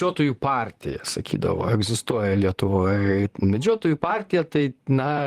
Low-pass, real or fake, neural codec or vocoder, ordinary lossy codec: 14.4 kHz; fake; vocoder, 44.1 kHz, 128 mel bands, Pupu-Vocoder; Opus, 24 kbps